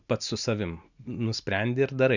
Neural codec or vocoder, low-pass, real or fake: none; 7.2 kHz; real